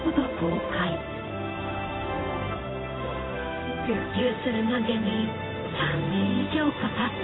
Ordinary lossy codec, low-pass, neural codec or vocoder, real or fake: AAC, 16 kbps; 7.2 kHz; codec, 16 kHz in and 24 kHz out, 1 kbps, XY-Tokenizer; fake